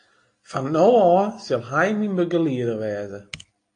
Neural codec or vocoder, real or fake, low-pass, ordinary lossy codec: none; real; 9.9 kHz; AAC, 48 kbps